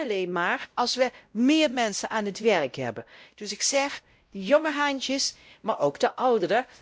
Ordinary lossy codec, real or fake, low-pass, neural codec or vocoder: none; fake; none; codec, 16 kHz, 0.5 kbps, X-Codec, WavLM features, trained on Multilingual LibriSpeech